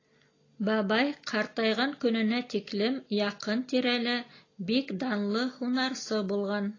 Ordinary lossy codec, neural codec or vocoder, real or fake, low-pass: AAC, 32 kbps; none; real; 7.2 kHz